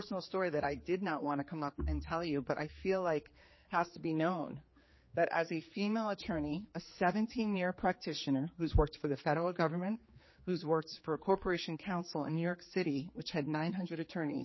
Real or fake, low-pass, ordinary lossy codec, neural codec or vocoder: fake; 7.2 kHz; MP3, 24 kbps; codec, 16 kHz, 4 kbps, X-Codec, HuBERT features, trained on general audio